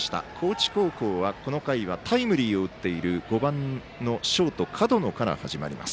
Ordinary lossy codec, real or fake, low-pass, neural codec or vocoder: none; real; none; none